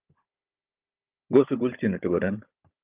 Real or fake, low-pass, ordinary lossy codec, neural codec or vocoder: fake; 3.6 kHz; Opus, 32 kbps; codec, 16 kHz, 16 kbps, FunCodec, trained on Chinese and English, 50 frames a second